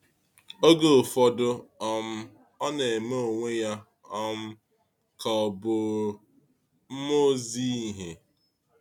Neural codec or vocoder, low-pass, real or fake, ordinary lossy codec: none; 19.8 kHz; real; none